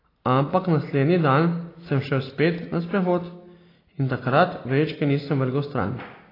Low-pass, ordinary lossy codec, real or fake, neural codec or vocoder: 5.4 kHz; AAC, 24 kbps; real; none